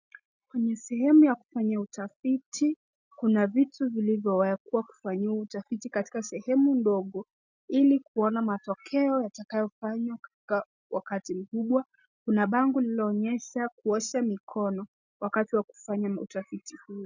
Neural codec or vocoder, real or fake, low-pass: none; real; 7.2 kHz